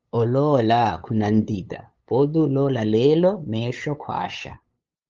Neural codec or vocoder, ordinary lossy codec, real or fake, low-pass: codec, 16 kHz, 8 kbps, FunCodec, trained on LibriTTS, 25 frames a second; Opus, 24 kbps; fake; 7.2 kHz